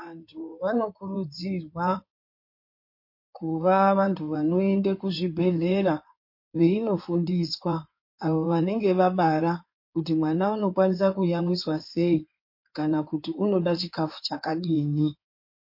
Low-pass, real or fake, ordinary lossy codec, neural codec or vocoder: 5.4 kHz; fake; MP3, 32 kbps; codec, 16 kHz in and 24 kHz out, 2.2 kbps, FireRedTTS-2 codec